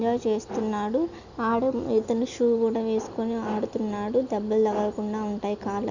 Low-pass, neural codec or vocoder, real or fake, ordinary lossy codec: 7.2 kHz; none; real; none